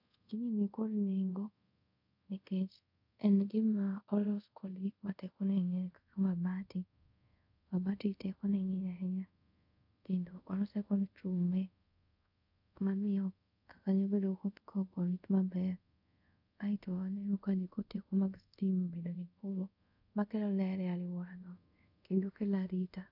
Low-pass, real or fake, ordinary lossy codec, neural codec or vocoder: 5.4 kHz; fake; none; codec, 24 kHz, 0.5 kbps, DualCodec